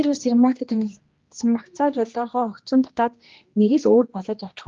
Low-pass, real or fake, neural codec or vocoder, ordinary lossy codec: 7.2 kHz; fake; codec, 16 kHz, 1 kbps, X-Codec, HuBERT features, trained on general audio; Opus, 32 kbps